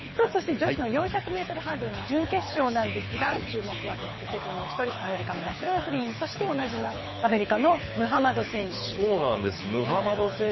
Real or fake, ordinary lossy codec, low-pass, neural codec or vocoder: fake; MP3, 24 kbps; 7.2 kHz; codec, 24 kHz, 6 kbps, HILCodec